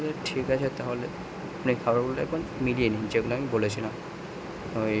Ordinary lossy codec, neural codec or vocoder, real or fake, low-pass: none; none; real; none